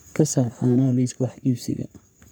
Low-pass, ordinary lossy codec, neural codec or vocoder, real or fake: none; none; codec, 44.1 kHz, 3.4 kbps, Pupu-Codec; fake